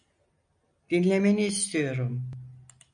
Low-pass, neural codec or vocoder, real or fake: 9.9 kHz; none; real